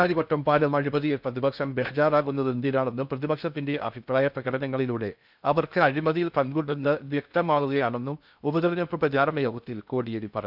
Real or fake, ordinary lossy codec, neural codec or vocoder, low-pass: fake; none; codec, 16 kHz in and 24 kHz out, 0.6 kbps, FocalCodec, streaming, 2048 codes; 5.4 kHz